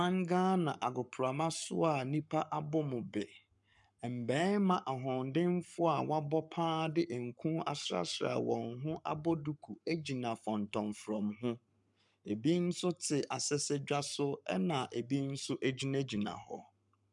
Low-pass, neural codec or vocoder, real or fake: 10.8 kHz; codec, 44.1 kHz, 7.8 kbps, Pupu-Codec; fake